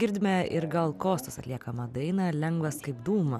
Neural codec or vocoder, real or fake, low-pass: none; real; 14.4 kHz